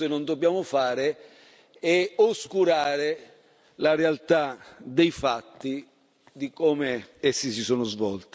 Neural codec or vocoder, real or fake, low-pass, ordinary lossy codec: none; real; none; none